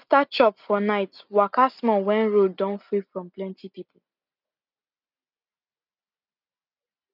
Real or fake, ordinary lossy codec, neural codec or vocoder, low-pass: real; none; none; 5.4 kHz